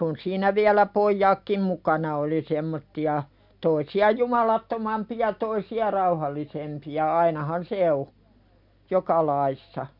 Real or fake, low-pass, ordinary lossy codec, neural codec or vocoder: real; 5.4 kHz; MP3, 48 kbps; none